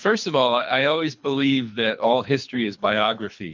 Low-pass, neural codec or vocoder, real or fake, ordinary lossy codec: 7.2 kHz; codec, 24 kHz, 3 kbps, HILCodec; fake; MP3, 64 kbps